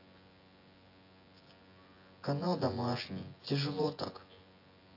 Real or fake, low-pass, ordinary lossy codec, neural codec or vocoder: fake; 5.4 kHz; AAC, 32 kbps; vocoder, 24 kHz, 100 mel bands, Vocos